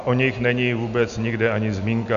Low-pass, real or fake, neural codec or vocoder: 7.2 kHz; real; none